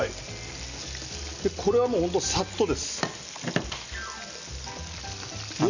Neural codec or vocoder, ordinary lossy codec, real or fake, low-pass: none; none; real; 7.2 kHz